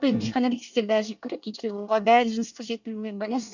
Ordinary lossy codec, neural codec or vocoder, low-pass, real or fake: none; codec, 24 kHz, 1 kbps, SNAC; 7.2 kHz; fake